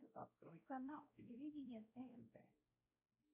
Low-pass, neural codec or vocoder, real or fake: 3.6 kHz; codec, 16 kHz, 0.5 kbps, X-Codec, WavLM features, trained on Multilingual LibriSpeech; fake